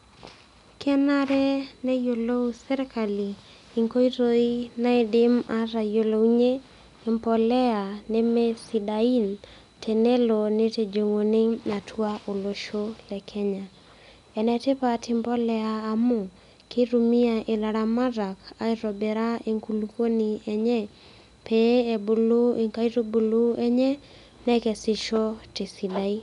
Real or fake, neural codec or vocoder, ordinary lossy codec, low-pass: real; none; none; 10.8 kHz